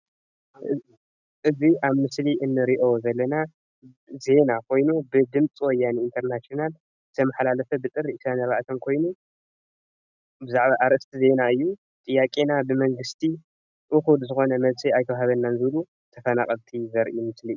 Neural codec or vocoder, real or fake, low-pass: none; real; 7.2 kHz